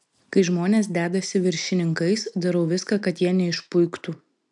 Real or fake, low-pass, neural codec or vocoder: real; 10.8 kHz; none